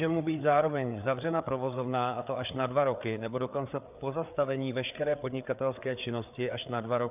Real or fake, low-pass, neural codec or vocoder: fake; 3.6 kHz; codec, 16 kHz, 4 kbps, FreqCodec, larger model